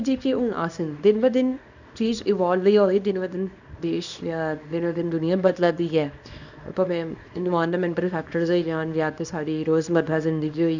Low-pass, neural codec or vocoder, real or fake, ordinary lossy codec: 7.2 kHz; codec, 24 kHz, 0.9 kbps, WavTokenizer, small release; fake; none